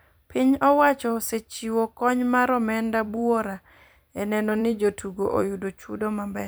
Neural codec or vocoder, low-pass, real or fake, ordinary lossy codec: vocoder, 44.1 kHz, 128 mel bands every 256 samples, BigVGAN v2; none; fake; none